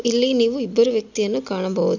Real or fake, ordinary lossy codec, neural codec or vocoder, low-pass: real; none; none; 7.2 kHz